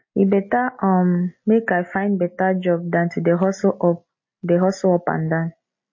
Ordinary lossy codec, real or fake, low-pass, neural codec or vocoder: MP3, 32 kbps; real; 7.2 kHz; none